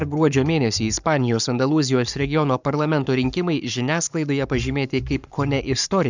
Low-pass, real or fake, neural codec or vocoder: 7.2 kHz; fake; codec, 44.1 kHz, 7.8 kbps, Pupu-Codec